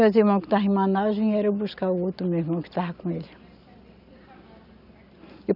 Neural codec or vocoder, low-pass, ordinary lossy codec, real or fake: none; 5.4 kHz; none; real